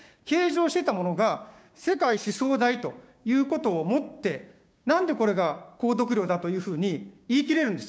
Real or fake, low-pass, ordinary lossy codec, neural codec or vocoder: fake; none; none; codec, 16 kHz, 6 kbps, DAC